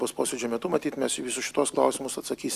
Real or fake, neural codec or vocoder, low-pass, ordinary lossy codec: real; none; 14.4 kHz; Opus, 64 kbps